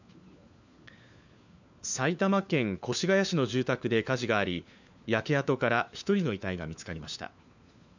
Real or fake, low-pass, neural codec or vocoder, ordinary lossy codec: fake; 7.2 kHz; codec, 16 kHz, 4 kbps, FunCodec, trained on LibriTTS, 50 frames a second; none